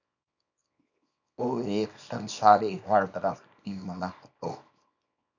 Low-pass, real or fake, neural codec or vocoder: 7.2 kHz; fake; codec, 24 kHz, 0.9 kbps, WavTokenizer, small release